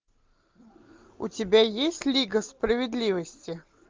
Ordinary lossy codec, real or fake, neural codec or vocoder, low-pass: Opus, 32 kbps; real; none; 7.2 kHz